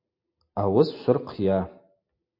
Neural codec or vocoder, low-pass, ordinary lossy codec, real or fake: none; 5.4 kHz; MP3, 32 kbps; real